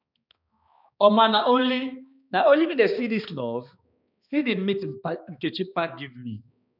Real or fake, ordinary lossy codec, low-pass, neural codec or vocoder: fake; none; 5.4 kHz; codec, 16 kHz, 2 kbps, X-Codec, HuBERT features, trained on balanced general audio